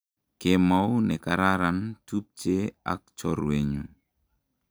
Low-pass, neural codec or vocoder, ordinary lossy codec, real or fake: none; none; none; real